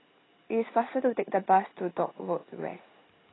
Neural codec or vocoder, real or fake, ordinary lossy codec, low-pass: none; real; AAC, 16 kbps; 7.2 kHz